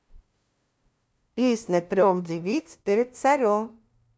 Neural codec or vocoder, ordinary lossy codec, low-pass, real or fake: codec, 16 kHz, 0.5 kbps, FunCodec, trained on LibriTTS, 25 frames a second; none; none; fake